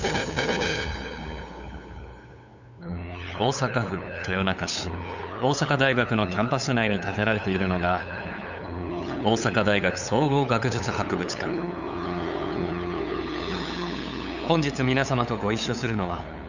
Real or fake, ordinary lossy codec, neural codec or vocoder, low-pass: fake; none; codec, 16 kHz, 8 kbps, FunCodec, trained on LibriTTS, 25 frames a second; 7.2 kHz